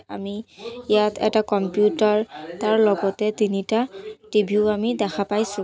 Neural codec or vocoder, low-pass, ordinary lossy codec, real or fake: none; none; none; real